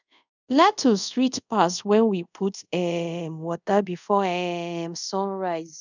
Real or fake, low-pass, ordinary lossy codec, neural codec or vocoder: fake; 7.2 kHz; none; codec, 24 kHz, 0.5 kbps, DualCodec